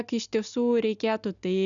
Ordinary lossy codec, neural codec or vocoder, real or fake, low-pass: MP3, 96 kbps; none; real; 7.2 kHz